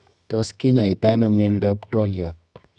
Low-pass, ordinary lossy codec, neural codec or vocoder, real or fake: 10.8 kHz; none; codec, 24 kHz, 0.9 kbps, WavTokenizer, medium music audio release; fake